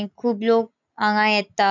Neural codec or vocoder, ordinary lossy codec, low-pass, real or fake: none; none; 7.2 kHz; real